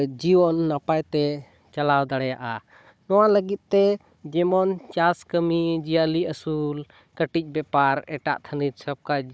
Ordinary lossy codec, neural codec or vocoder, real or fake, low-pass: none; codec, 16 kHz, 16 kbps, FunCodec, trained on Chinese and English, 50 frames a second; fake; none